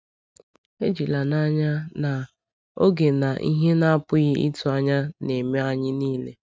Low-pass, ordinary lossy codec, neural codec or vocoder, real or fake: none; none; none; real